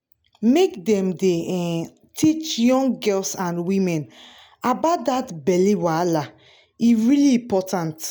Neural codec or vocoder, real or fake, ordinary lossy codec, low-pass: none; real; none; none